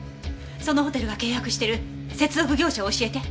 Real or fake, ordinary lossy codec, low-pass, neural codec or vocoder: real; none; none; none